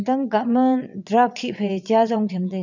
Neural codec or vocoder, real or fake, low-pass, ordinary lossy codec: vocoder, 22.05 kHz, 80 mel bands, WaveNeXt; fake; 7.2 kHz; none